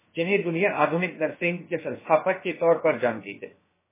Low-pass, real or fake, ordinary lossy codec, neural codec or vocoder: 3.6 kHz; fake; MP3, 16 kbps; codec, 16 kHz, about 1 kbps, DyCAST, with the encoder's durations